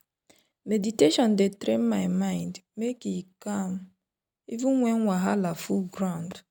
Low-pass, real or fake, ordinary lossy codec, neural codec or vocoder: 19.8 kHz; real; none; none